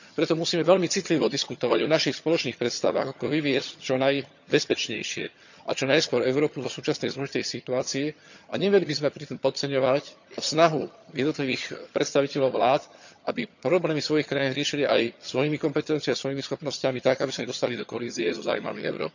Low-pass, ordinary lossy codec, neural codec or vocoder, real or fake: 7.2 kHz; none; vocoder, 22.05 kHz, 80 mel bands, HiFi-GAN; fake